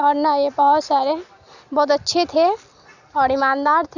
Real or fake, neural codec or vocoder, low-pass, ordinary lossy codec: real; none; 7.2 kHz; none